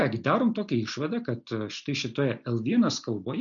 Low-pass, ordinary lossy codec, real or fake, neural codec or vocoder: 7.2 kHz; MP3, 64 kbps; real; none